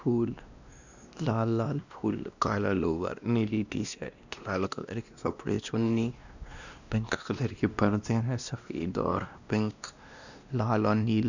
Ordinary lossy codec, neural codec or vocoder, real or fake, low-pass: none; codec, 16 kHz, 1 kbps, X-Codec, WavLM features, trained on Multilingual LibriSpeech; fake; 7.2 kHz